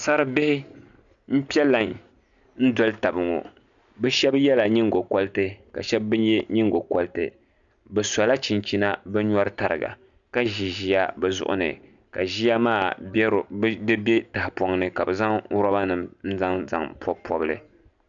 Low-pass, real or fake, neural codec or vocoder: 7.2 kHz; real; none